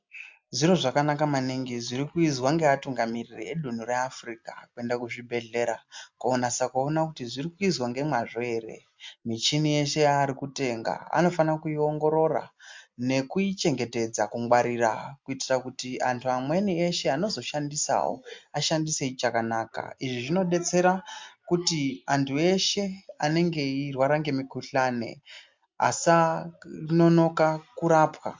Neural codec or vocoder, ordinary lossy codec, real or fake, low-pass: none; MP3, 64 kbps; real; 7.2 kHz